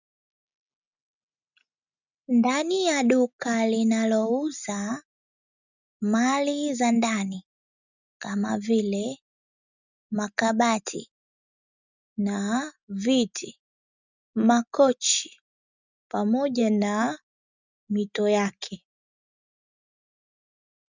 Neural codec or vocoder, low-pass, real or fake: none; 7.2 kHz; real